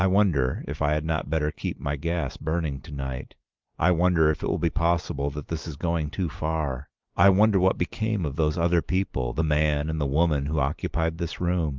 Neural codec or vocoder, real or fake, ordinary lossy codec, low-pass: none; real; Opus, 32 kbps; 7.2 kHz